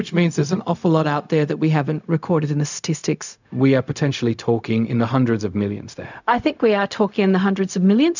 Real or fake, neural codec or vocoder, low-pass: fake; codec, 16 kHz, 0.4 kbps, LongCat-Audio-Codec; 7.2 kHz